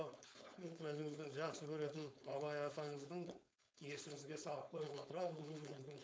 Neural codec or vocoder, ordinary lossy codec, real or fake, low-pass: codec, 16 kHz, 4.8 kbps, FACodec; none; fake; none